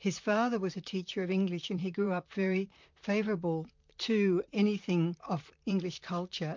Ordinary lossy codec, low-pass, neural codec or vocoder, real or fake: MP3, 64 kbps; 7.2 kHz; vocoder, 44.1 kHz, 128 mel bands, Pupu-Vocoder; fake